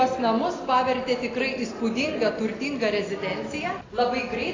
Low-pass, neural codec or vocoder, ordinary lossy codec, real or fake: 7.2 kHz; none; AAC, 32 kbps; real